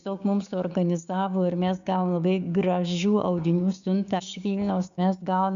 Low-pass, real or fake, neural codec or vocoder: 7.2 kHz; fake; codec, 16 kHz, 6 kbps, DAC